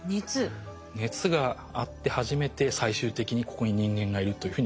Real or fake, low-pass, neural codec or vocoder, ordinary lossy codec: real; none; none; none